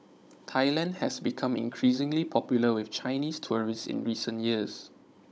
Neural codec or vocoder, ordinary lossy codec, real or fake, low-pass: codec, 16 kHz, 16 kbps, FunCodec, trained on Chinese and English, 50 frames a second; none; fake; none